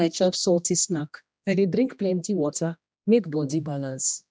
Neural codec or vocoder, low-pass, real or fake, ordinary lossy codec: codec, 16 kHz, 1 kbps, X-Codec, HuBERT features, trained on general audio; none; fake; none